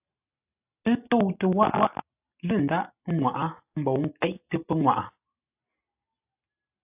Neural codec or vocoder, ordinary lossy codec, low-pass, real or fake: none; AAC, 32 kbps; 3.6 kHz; real